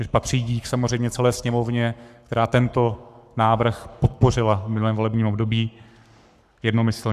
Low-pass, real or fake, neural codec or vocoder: 14.4 kHz; fake; codec, 44.1 kHz, 7.8 kbps, Pupu-Codec